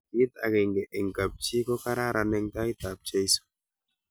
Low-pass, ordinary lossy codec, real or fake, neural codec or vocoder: none; none; real; none